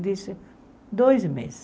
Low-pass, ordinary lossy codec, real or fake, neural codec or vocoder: none; none; real; none